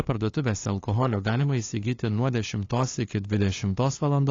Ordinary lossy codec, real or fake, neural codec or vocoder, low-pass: AAC, 32 kbps; fake; codec, 16 kHz, 2 kbps, FunCodec, trained on LibriTTS, 25 frames a second; 7.2 kHz